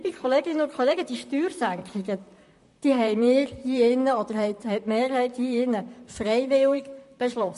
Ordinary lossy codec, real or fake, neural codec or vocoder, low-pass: MP3, 48 kbps; fake; vocoder, 44.1 kHz, 128 mel bands, Pupu-Vocoder; 14.4 kHz